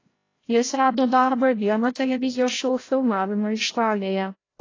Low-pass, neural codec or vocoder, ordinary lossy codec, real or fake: 7.2 kHz; codec, 16 kHz, 0.5 kbps, FreqCodec, larger model; AAC, 32 kbps; fake